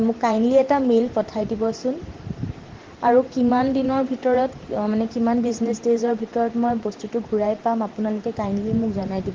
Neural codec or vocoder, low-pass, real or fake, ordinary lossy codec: vocoder, 44.1 kHz, 128 mel bands every 512 samples, BigVGAN v2; 7.2 kHz; fake; Opus, 16 kbps